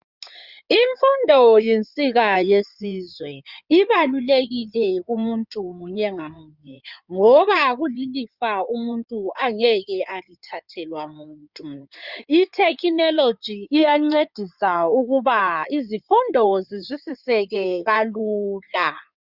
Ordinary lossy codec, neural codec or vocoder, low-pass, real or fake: Opus, 64 kbps; codec, 16 kHz in and 24 kHz out, 2.2 kbps, FireRedTTS-2 codec; 5.4 kHz; fake